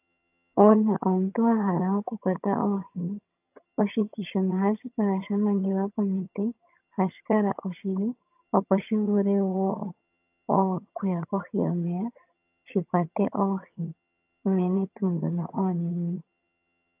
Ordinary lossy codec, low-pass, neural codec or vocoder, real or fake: AAC, 32 kbps; 3.6 kHz; vocoder, 22.05 kHz, 80 mel bands, HiFi-GAN; fake